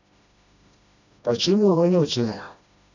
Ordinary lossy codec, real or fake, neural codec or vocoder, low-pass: none; fake; codec, 16 kHz, 1 kbps, FreqCodec, smaller model; 7.2 kHz